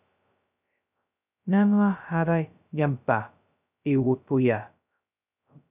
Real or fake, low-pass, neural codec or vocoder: fake; 3.6 kHz; codec, 16 kHz, 0.2 kbps, FocalCodec